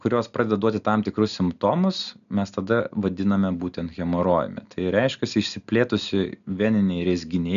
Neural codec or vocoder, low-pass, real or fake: none; 7.2 kHz; real